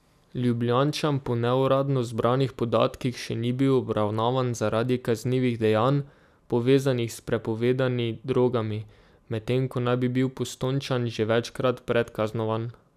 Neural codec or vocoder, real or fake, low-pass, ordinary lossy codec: none; real; 14.4 kHz; none